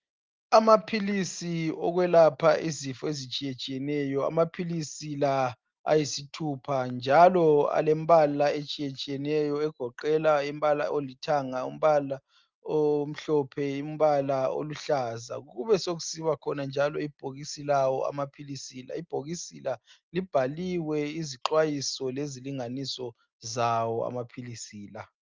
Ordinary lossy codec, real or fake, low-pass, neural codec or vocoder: Opus, 32 kbps; real; 7.2 kHz; none